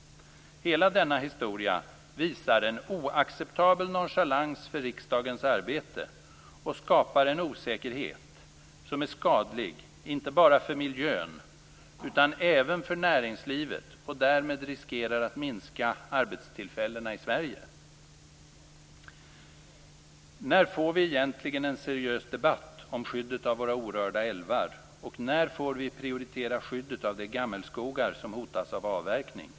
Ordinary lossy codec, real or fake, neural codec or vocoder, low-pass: none; real; none; none